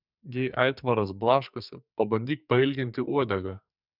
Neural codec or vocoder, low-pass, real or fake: codec, 44.1 kHz, 2.6 kbps, SNAC; 5.4 kHz; fake